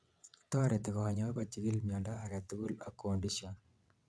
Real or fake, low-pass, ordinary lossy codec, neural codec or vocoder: fake; none; none; vocoder, 22.05 kHz, 80 mel bands, WaveNeXt